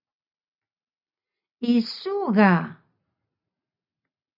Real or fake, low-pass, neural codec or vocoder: real; 5.4 kHz; none